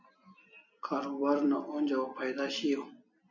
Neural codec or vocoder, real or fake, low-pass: none; real; 7.2 kHz